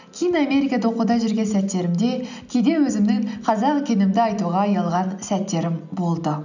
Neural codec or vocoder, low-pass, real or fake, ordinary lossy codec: none; 7.2 kHz; real; none